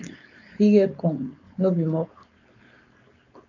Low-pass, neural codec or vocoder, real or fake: 7.2 kHz; codec, 16 kHz, 4.8 kbps, FACodec; fake